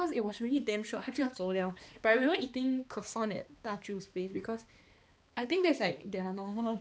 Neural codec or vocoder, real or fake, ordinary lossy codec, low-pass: codec, 16 kHz, 2 kbps, X-Codec, HuBERT features, trained on balanced general audio; fake; none; none